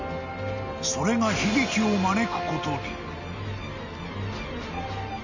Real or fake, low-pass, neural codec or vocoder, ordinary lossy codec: real; 7.2 kHz; none; Opus, 64 kbps